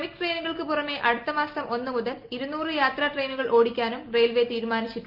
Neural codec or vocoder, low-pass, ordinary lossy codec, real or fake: none; 5.4 kHz; Opus, 24 kbps; real